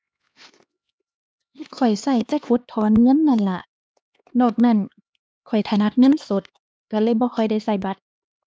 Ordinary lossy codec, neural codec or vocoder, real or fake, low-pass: none; codec, 16 kHz, 2 kbps, X-Codec, HuBERT features, trained on LibriSpeech; fake; none